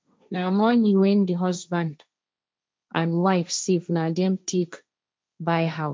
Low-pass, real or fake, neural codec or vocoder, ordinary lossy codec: none; fake; codec, 16 kHz, 1.1 kbps, Voila-Tokenizer; none